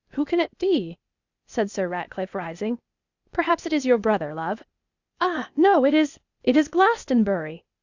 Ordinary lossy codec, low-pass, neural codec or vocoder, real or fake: Opus, 64 kbps; 7.2 kHz; codec, 16 kHz, 0.8 kbps, ZipCodec; fake